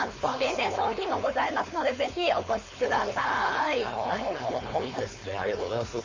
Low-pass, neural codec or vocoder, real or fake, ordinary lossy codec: 7.2 kHz; codec, 16 kHz, 4.8 kbps, FACodec; fake; MP3, 32 kbps